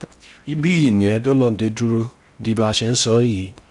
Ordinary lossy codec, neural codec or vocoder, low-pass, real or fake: none; codec, 16 kHz in and 24 kHz out, 0.6 kbps, FocalCodec, streaming, 4096 codes; 10.8 kHz; fake